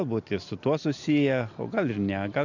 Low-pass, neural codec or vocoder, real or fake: 7.2 kHz; none; real